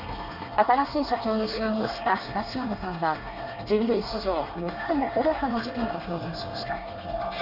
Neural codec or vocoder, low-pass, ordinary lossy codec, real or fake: codec, 24 kHz, 1 kbps, SNAC; 5.4 kHz; none; fake